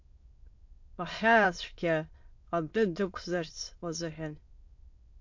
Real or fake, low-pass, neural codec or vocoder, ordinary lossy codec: fake; 7.2 kHz; autoencoder, 22.05 kHz, a latent of 192 numbers a frame, VITS, trained on many speakers; MP3, 48 kbps